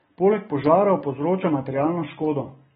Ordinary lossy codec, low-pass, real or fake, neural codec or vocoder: AAC, 16 kbps; 19.8 kHz; real; none